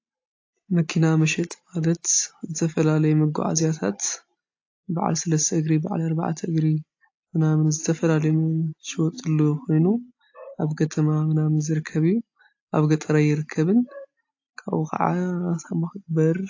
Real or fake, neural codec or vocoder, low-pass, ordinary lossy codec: real; none; 7.2 kHz; AAC, 48 kbps